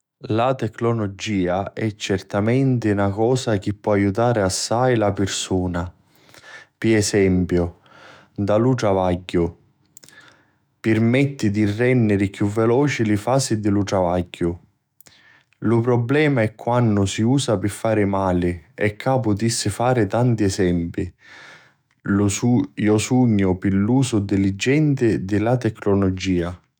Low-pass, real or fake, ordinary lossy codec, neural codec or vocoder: none; fake; none; autoencoder, 48 kHz, 128 numbers a frame, DAC-VAE, trained on Japanese speech